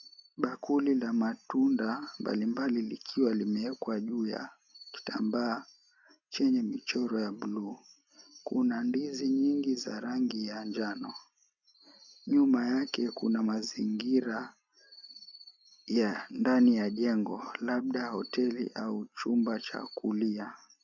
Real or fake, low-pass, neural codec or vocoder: real; 7.2 kHz; none